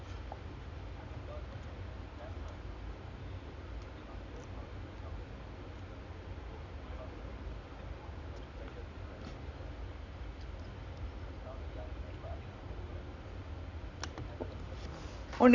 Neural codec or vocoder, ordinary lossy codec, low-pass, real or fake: none; Opus, 64 kbps; 7.2 kHz; real